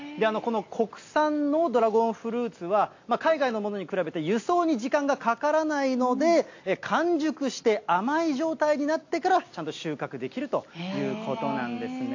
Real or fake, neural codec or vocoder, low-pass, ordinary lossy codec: real; none; 7.2 kHz; AAC, 48 kbps